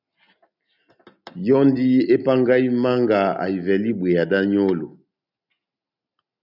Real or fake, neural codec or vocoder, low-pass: real; none; 5.4 kHz